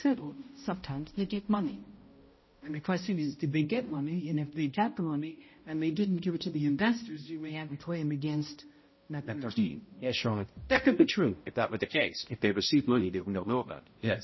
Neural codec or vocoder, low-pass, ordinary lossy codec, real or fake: codec, 16 kHz, 0.5 kbps, X-Codec, HuBERT features, trained on balanced general audio; 7.2 kHz; MP3, 24 kbps; fake